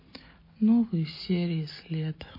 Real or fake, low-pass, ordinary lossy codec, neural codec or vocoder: real; 5.4 kHz; MP3, 24 kbps; none